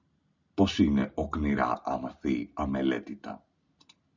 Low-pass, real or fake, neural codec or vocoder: 7.2 kHz; real; none